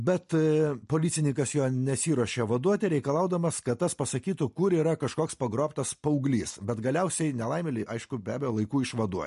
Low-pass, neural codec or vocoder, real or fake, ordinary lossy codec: 14.4 kHz; none; real; MP3, 48 kbps